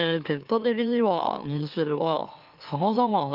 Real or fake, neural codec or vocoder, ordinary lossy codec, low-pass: fake; autoencoder, 44.1 kHz, a latent of 192 numbers a frame, MeloTTS; Opus, 24 kbps; 5.4 kHz